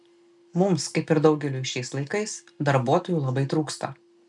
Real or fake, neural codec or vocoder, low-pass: real; none; 10.8 kHz